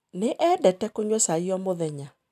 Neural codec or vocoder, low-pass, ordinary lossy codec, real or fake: none; 14.4 kHz; none; real